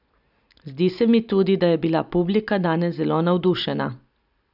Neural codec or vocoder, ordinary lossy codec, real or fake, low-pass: none; none; real; 5.4 kHz